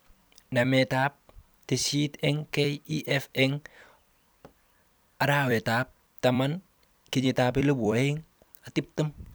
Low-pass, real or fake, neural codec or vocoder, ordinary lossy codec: none; fake; vocoder, 44.1 kHz, 128 mel bands every 256 samples, BigVGAN v2; none